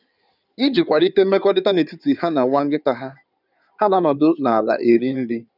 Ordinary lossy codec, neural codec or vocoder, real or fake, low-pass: none; codec, 16 kHz in and 24 kHz out, 2.2 kbps, FireRedTTS-2 codec; fake; 5.4 kHz